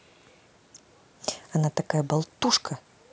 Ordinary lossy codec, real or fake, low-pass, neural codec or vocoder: none; real; none; none